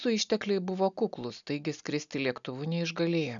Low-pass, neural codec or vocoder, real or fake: 7.2 kHz; none; real